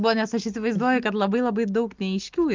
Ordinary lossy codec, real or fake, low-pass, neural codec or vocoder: Opus, 32 kbps; real; 7.2 kHz; none